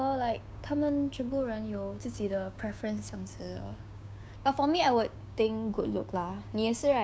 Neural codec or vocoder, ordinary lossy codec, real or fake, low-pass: codec, 16 kHz, 6 kbps, DAC; none; fake; none